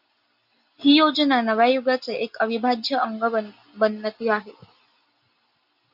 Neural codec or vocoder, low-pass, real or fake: none; 5.4 kHz; real